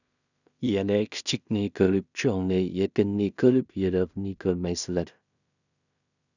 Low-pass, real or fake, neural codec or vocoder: 7.2 kHz; fake; codec, 16 kHz in and 24 kHz out, 0.4 kbps, LongCat-Audio-Codec, two codebook decoder